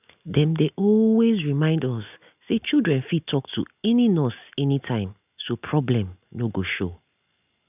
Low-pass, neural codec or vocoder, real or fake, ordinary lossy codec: 3.6 kHz; none; real; none